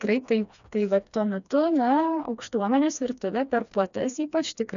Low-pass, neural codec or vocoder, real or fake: 7.2 kHz; codec, 16 kHz, 2 kbps, FreqCodec, smaller model; fake